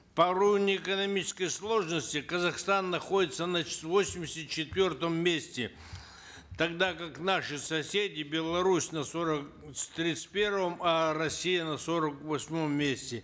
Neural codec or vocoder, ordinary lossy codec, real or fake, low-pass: none; none; real; none